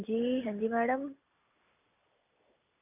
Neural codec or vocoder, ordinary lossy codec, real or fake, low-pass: none; none; real; 3.6 kHz